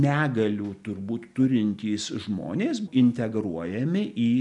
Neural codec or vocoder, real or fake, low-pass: none; real; 10.8 kHz